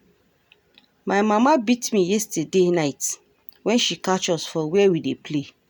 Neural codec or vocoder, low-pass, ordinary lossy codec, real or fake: none; none; none; real